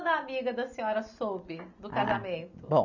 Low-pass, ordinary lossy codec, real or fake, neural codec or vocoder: 7.2 kHz; none; real; none